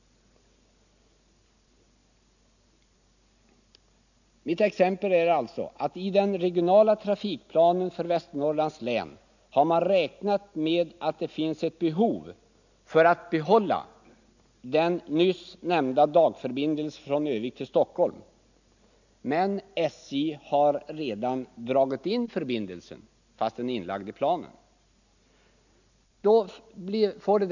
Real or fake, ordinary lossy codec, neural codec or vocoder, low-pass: real; none; none; 7.2 kHz